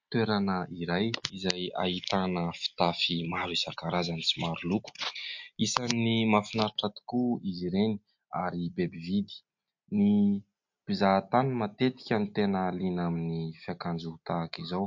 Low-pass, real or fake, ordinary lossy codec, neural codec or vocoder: 7.2 kHz; real; MP3, 48 kbps; none